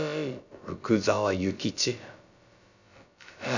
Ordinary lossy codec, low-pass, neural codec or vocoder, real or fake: none; 7.2 kHz; codec, 16 kHz, about 1 kbps, DyCAST, with the encoder's durations; fake